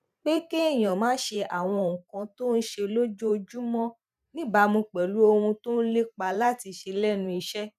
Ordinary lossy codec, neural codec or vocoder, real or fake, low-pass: none; vocoder, 44.1 kHz, 128 mel bands every 512 samples, BigVGAN v2; fake; 14.4 kHz